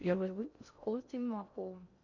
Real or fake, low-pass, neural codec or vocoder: fake; 7.2 kHz; codec, 16 kHz in and 24 kHz out, 0.6 kbps, FocalCodec, streaming, 2048 codes